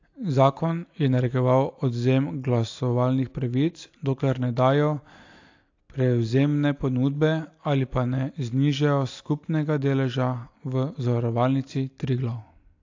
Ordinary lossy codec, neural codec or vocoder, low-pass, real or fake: AAC, 48 kbps; none; 7.2 kHz; real